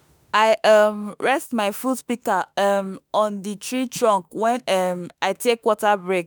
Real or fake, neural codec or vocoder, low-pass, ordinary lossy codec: fake; autoencoder, 48 kHz, 32 numbers a frame, DAC-VAE, trained on Japanese speech; none; none